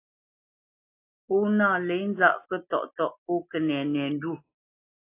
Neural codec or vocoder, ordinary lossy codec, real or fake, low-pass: none; AAC, 24 kbps; real; 3.6 kHz